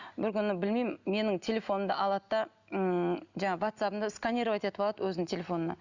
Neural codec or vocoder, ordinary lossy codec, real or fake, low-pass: none; Opus, 64 kbps; real; 7.2 kHz